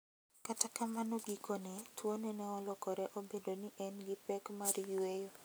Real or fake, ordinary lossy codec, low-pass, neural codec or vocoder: real; none; none; none